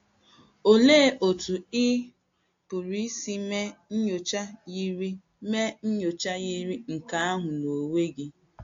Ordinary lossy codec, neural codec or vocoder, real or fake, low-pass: AAC, 48 kbps; none; real; 7.2 kHz